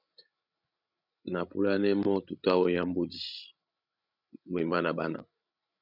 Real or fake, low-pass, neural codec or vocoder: fake; 5.4 kHz; codec, 16 kHz, 16 kbps, FreqCodec, larger model